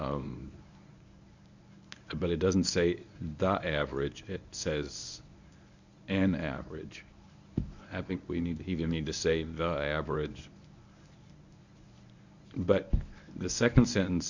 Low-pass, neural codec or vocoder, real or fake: 7.2 kHz; codec, 24 kHz, 0.9 kbps, WavTokenizer, medium speech release version 1; fake